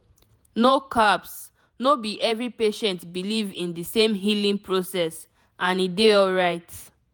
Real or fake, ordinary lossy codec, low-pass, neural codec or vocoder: fake; none; none; vocoder, 48 kHz, 128 mel bands, Vocos